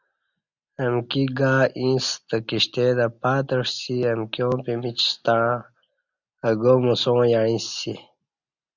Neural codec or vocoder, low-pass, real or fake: none; 7.2 kHz; real